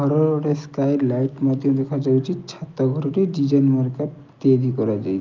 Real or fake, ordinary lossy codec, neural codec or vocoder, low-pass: real; Opus, 24 kbps; none; 7.2 kHz